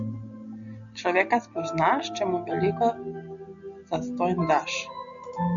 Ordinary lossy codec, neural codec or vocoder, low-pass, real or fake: MP3, 96 kbps; none; 7.2 kHz; real